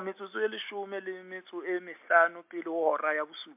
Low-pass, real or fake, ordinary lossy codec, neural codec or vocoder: 3.6 kHz; real; MP3, 32 kbps; none